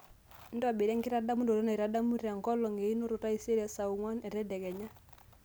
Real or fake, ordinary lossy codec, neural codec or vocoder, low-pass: real; none; none; none